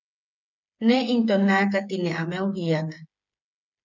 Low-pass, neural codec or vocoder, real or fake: 7.2 kHz; codec, 16 kHz, 8 kbps, FreqCodec, smaller model; fake